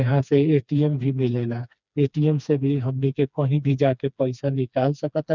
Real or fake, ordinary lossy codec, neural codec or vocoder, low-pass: fake; none; codec, 16 kHz, 2 kbps, FreqCodec, smaller model; 7.2 kHz